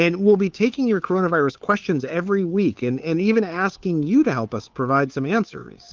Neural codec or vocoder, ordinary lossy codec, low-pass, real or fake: codec, 16 kHz, 2 kbps, FunCodec, trained on Chinese and English, 25 frames a second; Opus, 32 kbps; 7.2 kHz; fake